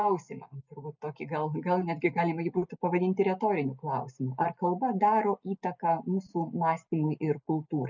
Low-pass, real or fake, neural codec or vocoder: 7.2 kHz; real; none